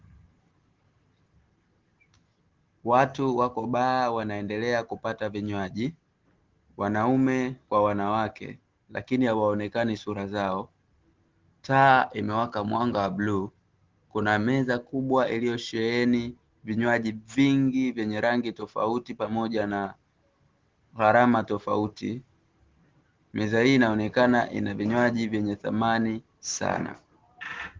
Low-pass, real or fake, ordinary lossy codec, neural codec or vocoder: 7.2 kHz; real; Opus, 16 kbps; none